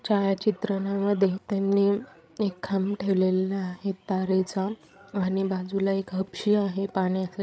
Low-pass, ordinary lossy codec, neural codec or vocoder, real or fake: none; none; codec, 16 kHz, 8 kbps, FreqCodec, larger model; fake